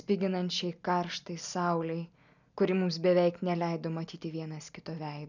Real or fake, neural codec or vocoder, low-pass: real; none; 7.2 kHz